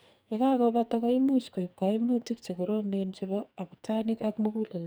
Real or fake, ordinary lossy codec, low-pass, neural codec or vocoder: fake; none; none; codec, 44.1 kHz, 2.6 kbps, SNAC